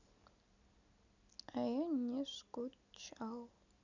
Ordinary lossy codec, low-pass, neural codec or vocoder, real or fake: none; 7.2 kHz; none; real